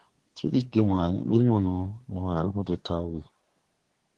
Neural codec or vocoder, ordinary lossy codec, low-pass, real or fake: codec, 24 kHz, 1 kbps, SNAC; Opus, 16 kbps; 10.8 kHz; fake